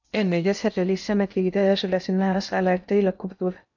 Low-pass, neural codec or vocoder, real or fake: 7.2 kHz; codec, 16 kHz in and 24 kHz out, 0.6 kbps, FocalCodec, streaming, 2048 codes; fake